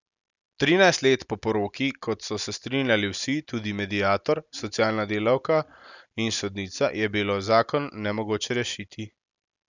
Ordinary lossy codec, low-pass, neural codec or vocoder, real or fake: none; 7.2 kHz; none; real